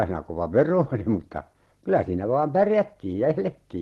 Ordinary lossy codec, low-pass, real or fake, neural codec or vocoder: Opus, 16 kbps; 19.8 kHz; real; none